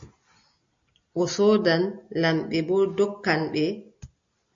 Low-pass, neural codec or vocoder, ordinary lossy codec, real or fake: 7.2 kHz; none; MP3, 48 kbps; real